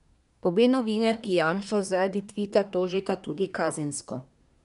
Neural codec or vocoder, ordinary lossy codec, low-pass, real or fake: codec, 24 kHz, 1 kbps, SNAC; none; 10.8 kHz; fake